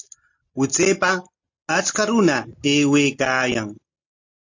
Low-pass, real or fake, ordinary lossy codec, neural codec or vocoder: 7.2 kHz; real; AAC, 48 kbps; none